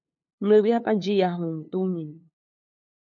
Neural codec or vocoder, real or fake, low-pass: codec, 16 kHz, 2 kbps, FunCodec, trained on LibriTTS, 25 frames a second; fake; 7.2 kHz